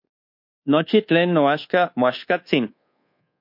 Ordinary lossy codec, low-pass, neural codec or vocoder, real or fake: MP3, 32 kbps; 5.4 kHz; codec, 24 kHz, 1.2 kbps, DualCodec; fake